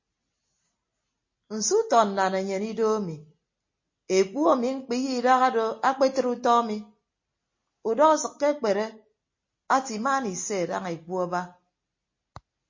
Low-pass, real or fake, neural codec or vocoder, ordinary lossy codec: 7.2 kHz; real; none; MP3, 32 kbps